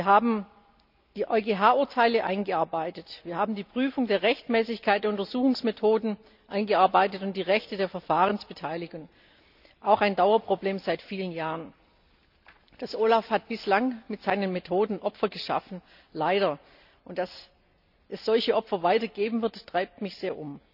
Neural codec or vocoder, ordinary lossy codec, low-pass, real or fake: none; none; 5.4 kHz; real